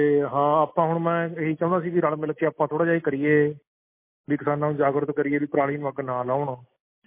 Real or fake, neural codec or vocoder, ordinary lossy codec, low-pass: real; none; MP3, 24 kbps; 3.6 kHz